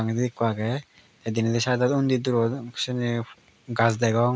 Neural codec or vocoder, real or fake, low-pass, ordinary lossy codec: none; real; none; none